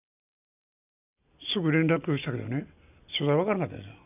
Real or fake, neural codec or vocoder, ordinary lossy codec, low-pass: real; none; none; 3.6 kHz